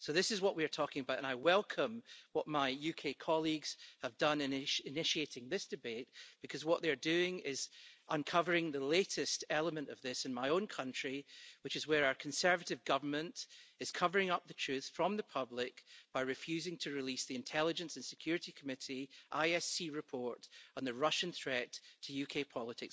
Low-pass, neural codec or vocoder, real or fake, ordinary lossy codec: none; none; real; none